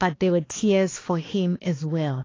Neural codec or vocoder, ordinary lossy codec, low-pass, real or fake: codec, 16 kHz, 2 kbps, X-Codec, HuBERT features, trained on balanced general audio; AAC, 32 kbps; 7.2 kHz; fake